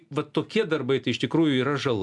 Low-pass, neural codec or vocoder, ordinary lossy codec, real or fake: 10.8 kHz; none; MP3, 96 kbps; real